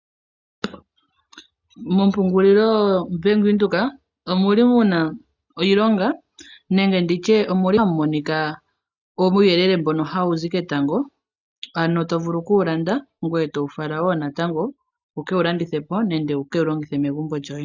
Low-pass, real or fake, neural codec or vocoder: 7.2 kHz; real; none